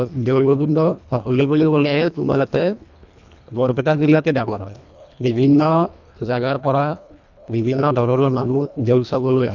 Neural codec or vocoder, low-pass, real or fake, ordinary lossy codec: codec, 24 kHz, 1.5 kbps, HILCodec; 7.2 kHz; fake; none